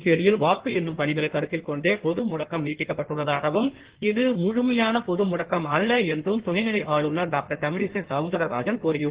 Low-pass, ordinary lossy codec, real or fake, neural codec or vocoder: 3.6 kHz; Opus, 16 kbps; fake; codec, 16 kHz in and 24 kHz out, 1.1 kbps, FireRedTTS-2 codec